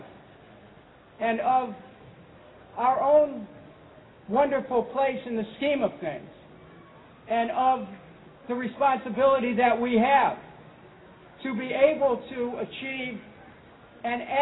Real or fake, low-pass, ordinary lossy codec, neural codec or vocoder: real; 7.2 kHz; AAC, 16 kbps; none